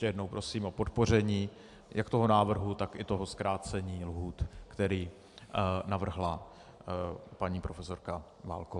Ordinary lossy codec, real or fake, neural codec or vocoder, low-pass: AAC, 64 kbps; real; none; 10.8 kHz